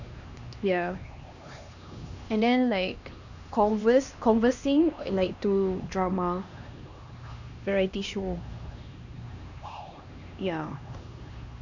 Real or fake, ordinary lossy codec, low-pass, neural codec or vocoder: fake; none; 7.2 kHz; codec, 16 kHz, 2 kbps, X-Codec, HuBERT features, trained on LibriSpeech